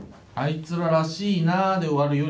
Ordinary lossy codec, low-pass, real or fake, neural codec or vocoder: none; none; real; none